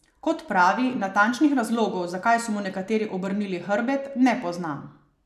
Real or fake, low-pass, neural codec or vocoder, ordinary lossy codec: real; 14.4 kHz; none; none